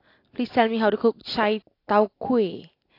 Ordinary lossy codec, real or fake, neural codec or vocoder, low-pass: AAC, 32 kbps; real; none; 5.4 kHz